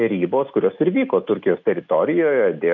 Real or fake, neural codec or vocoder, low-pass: real; none; 7.2 kHz